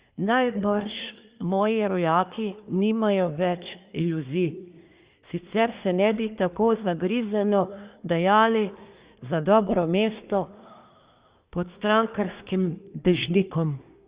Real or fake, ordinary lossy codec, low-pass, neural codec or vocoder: fake; Opus, 64 kbps; 3.6 kHz; codec, 24 kHz, 1 kbps, SNAC